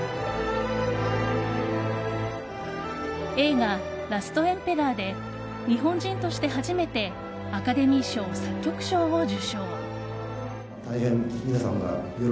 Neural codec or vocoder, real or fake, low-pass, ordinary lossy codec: none; real; none; none